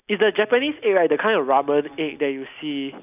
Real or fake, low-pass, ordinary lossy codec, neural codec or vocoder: real; 3.6 kHz; none; none